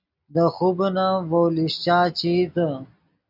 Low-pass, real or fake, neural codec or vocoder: 5.4 kHz; real; none